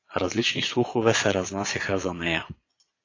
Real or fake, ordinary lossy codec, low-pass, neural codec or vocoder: fake; AAC, 32 kbps; 7.2 kHz; vocoder, 22.05 kHz, 80 mel bands, Vocos